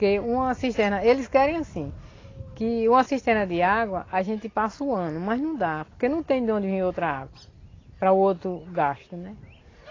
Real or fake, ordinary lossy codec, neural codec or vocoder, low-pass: real; AAC, 32 kbps; none; 7.2 kHz